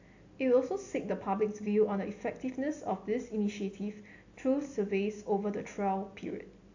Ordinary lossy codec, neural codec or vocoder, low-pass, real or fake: AAC, 48 kbps; none; 7.2 kHz; real